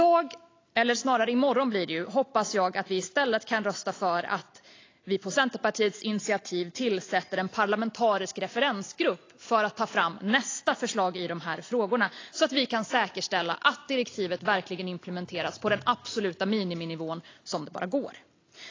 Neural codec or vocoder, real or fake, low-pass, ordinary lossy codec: none; real; 7.2 kHz; AAC, 32 kbps